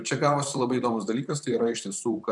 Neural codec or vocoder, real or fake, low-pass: vocoder, 44.1 kHz, 128 mel bands, Pupu-Vocoder; fake; 10.8 kHz